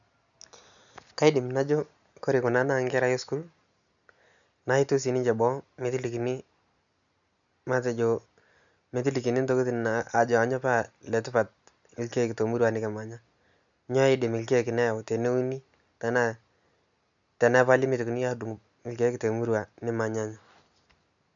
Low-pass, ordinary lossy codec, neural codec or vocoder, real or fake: 7.2 kHz; none; none; real